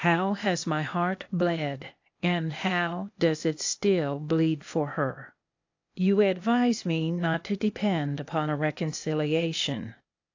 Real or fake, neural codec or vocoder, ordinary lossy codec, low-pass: fake; codec, 16 kHz, 0.8 kbps, ZipCodec; AAC, 48 kbps; 7.2 kHz